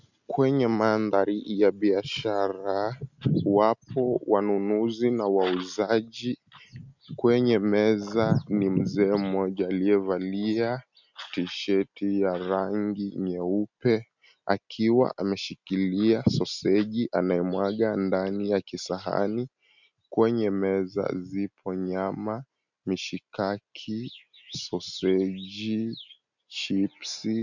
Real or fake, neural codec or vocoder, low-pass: real; none; 7.2 kHz